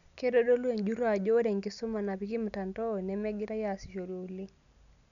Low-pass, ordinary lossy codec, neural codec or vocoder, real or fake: 7.2 kHz; none; none; real